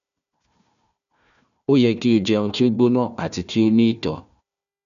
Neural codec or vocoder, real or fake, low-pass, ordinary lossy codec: codec, 16 kHz, 1 kbps, FunCodec, trained on Chinese and English, 50 frames a second; fake; 7.2 kHz; none